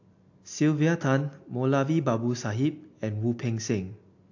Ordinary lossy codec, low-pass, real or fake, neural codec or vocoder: AAC, 48 kbps; 7.2 kHz; real; none